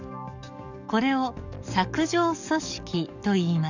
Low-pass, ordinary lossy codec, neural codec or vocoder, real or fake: 7.2 kHz; none; codec, 44.1 kHz, 7.8 kbps, DAC; fake